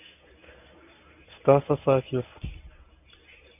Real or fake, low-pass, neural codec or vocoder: fake; 3.6 kHz; codec, 24 kHz, 0.9 kbps, WavTokenizer, medium speech release version 1